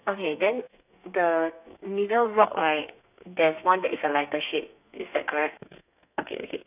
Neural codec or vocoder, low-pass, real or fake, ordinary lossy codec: codec, 32 kHz, 1.9 kbps, SNAC; 3.6 kHz; fake; none